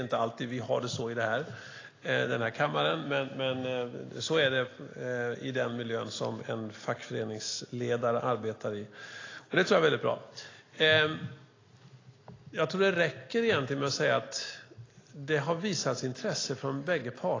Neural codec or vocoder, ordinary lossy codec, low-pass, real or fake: none; AAC, 32 kbps; 7.2 kHz; real